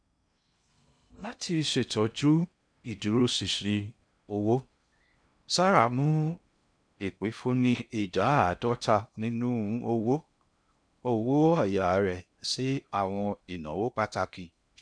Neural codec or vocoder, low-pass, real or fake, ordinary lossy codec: codec, 16 kHz in and 24 kHz out, 0.6 kbps, FocalCodec, streaming, 2048 codes; 9.9 kHz; fake; none